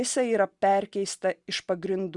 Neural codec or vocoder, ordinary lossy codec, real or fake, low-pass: none; Opus, 64 kbps; real; 10.8 kHz